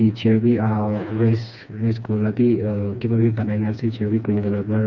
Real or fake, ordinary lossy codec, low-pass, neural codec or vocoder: fake; none; 7.2 kHz; codec, 16 kHz, 2 kbps, FreqCodec, smaller model